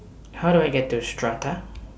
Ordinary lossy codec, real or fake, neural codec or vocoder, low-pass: none; real; none; none